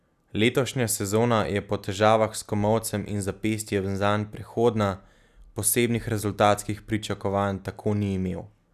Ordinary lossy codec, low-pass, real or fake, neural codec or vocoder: none; 14.4 kHz; real; none